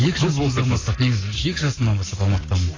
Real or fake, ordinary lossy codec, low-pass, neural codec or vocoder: fake; AAC, 32 kbps; 7.2 kHz; codec, 44.1 kHz, 7.8 kbps, Pupu-Codec